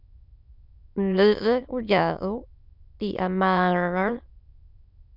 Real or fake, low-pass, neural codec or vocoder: fake; 5.4 kHz; autoencoder, 22.05 kHz, a latent of 192 numbers a frame, VITS, trained on many speakers